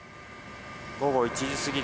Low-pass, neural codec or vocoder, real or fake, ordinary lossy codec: none; none; real; none